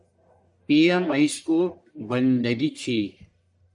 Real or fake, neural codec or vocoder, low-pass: fake; codec, 44.1 kHz, 1.7 kbps, Pupu-Codec; 10.8 kHz